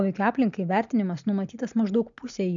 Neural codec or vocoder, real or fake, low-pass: none; real; 7.2 kHz